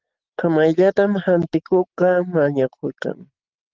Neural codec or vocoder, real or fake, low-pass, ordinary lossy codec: none; real; 7.2 kHz; Opus, 16 kbps